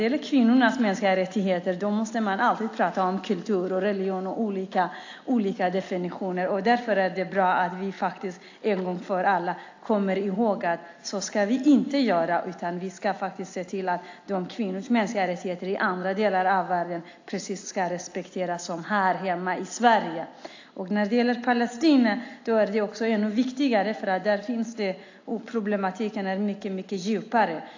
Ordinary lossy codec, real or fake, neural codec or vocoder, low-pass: AAC, 48 kbps; real; none; 7.2 kHz